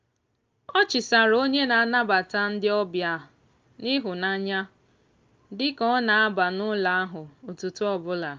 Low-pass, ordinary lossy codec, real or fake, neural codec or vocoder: 7.2 kHz; Opus, 24 kbps; real; none